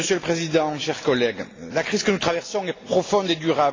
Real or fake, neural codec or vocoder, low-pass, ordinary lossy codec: real; none; 7.2 kHz; AAC, 32 kbps